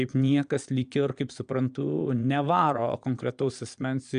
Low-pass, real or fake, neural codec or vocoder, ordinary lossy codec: 9.9 kHz; fake; vocoder, 22.05 kHz, 80 mel bands, Vocos; MP3, 96 kbps